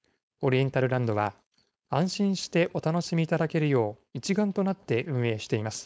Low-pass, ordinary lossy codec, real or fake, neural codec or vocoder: none; none; fake; codec, 16 kHz, 4.8 kbps, FACodec